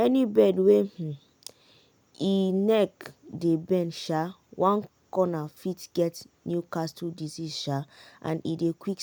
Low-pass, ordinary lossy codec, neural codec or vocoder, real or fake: none; none; none; real